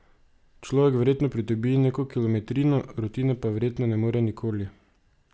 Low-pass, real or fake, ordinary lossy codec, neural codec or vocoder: none; real; none; none